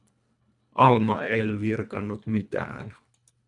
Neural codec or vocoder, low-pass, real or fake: codec, 24 kHz, 1.5 kbps, HILCodec; 10.8 kHz; fake